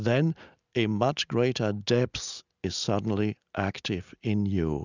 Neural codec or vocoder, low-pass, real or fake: none; 7.2 kHz; real